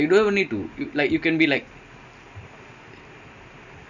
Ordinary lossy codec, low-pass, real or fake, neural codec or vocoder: none; 7.2 kHz; real; none